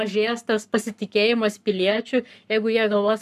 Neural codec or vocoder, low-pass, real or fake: codec, 44.1 kHz, 7.8 kbps, Pupu-Codec; 14.4 kHz; fake